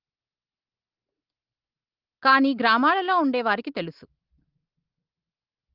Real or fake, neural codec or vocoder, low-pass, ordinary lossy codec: real; none; 5.4 kHz; Opus, 32 kbps